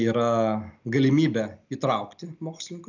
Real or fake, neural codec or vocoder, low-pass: real; none; 7.2 kHz